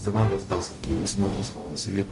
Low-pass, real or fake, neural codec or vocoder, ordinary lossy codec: 14.4 kHz; fake; codec, 44.1 kHz, 0.9 kbps, DAC; MP3, 48 kbps